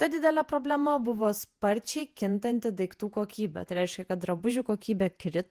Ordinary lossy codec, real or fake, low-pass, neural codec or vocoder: Opus, 24 kbps; fake; 14.4 kHz; vocoder, 48 kHz, 128 mel bands, Vocos